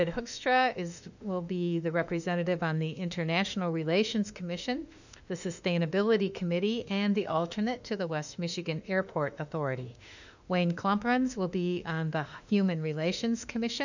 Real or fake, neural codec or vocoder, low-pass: fake; autoencoder, 48 kHz, 32 numbers a frame, DAC-VAE, trained on Japanese speech; 7.2 kHz